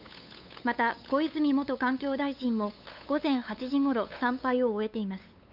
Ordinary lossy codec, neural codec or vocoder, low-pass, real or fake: none; codec, 16 kHz, 8 kbps, FunCodec, trained on LibriTTS, 25 frames a second; 5.4 kHz; fake